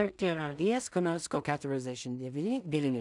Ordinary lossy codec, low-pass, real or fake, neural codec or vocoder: MP3, 96 kbps; 10.8 kHz; fake; codec, 16 kHz in and 24 kHz out, 0.4 kbps, LongCat-Audio-Codec, two codebook decoder